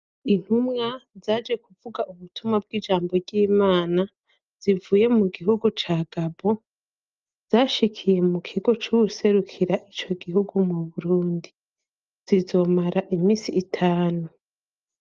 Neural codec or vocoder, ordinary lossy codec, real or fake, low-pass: none; Opus, 24 kbps; real; 7.2 kHz